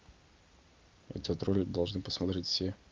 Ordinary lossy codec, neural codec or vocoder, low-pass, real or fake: Opus, 24 kbps; none; 7.2 kHz; real